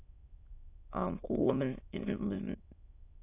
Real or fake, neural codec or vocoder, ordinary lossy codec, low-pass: fake; autoencoder, 22.05 kHz, a latent of 192 numbers a frame, VITS, trained on many speakers; AAC, 32 kbps; 3.6 kHz